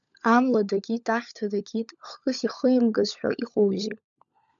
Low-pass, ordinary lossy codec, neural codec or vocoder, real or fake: 7.2 kHz; MP3, 96 kbps; codec, 16 kHz, 16 kbps, FunCodec, trained on LibriTTS, 50 frames a second; fake